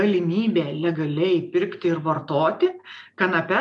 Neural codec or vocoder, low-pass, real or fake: none; 10.8 kHz; real